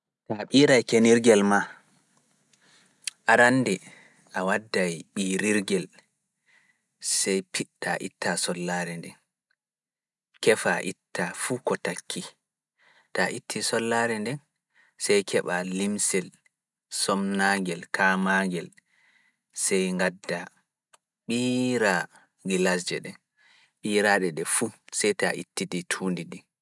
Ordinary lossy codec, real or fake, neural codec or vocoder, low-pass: none; real; none; none